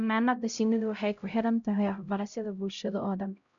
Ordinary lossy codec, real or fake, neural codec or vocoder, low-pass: none; fake; codec, 16 kHz, 0.5 kbps, X-Codec, HuBERT features, trained on LibriSpeech; 7.2 kHz